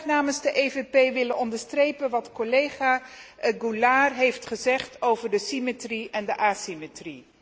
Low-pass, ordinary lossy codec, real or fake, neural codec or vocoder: none; none; real; none